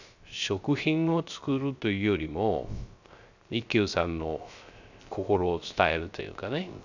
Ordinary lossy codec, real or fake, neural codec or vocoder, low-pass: none; fake; codec, 16 kHz, 0.3 kbps, FocalCodec; 7.2 kHz